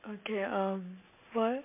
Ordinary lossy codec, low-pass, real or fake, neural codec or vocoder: MP3, 32 kbps; 3.6 kHz; real; none